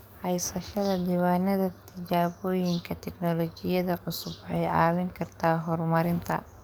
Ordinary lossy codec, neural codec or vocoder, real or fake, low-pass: none; codec, 44.1 kHz, 7.8 kbps, DAC; fake; none